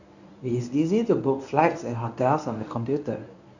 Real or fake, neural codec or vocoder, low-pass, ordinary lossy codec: fake; codec, 24 kHz, 0.9 kbps, WavTokenizer, medium speech release version 1; 7.2 kHz; none